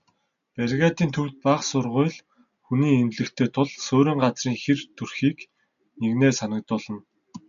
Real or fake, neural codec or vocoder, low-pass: real; none; 7.2 kHz